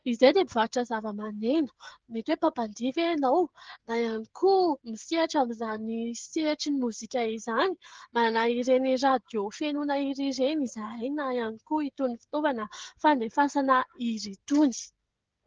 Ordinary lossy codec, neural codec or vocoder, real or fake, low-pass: Opus, 16 kbps; codec, 16 kHz, 16 kbps, FreqCodec, smaller model; fake; 7.2 kHz